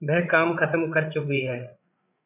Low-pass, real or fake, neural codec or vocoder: 3.6 kHz; fake; codec, 16 kHz, 8 kbps, FreqCodec, larger model